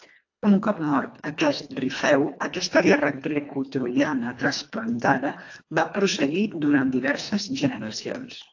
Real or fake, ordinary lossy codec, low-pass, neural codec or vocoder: fake; AAC, 48 kbps; 7.2 kHz; codec, 24 kHz, 1.5 kbps, HILCodec